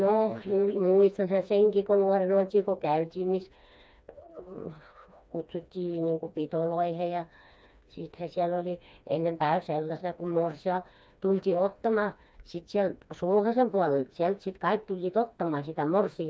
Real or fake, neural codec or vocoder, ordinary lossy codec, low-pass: fake; codec, 16 kHz, 2 kbps, FreqCodec, smaller model; none; none